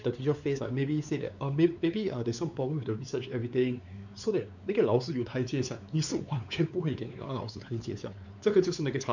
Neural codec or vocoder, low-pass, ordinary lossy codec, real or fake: codec, 16 kHz, 4 kbps, X-Codec, WavLM features, trained on Multilingual LibriSpeech; 7.2 kHz; none; fake